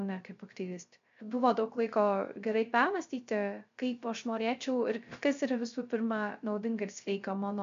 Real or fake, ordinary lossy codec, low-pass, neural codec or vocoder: fake; MP3, 64 kbps; 7.2 kHz; codec, 16 kHz, 0.3 kbps, FocalCodec